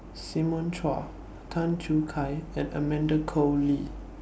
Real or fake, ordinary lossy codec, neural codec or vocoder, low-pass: real; none; none; none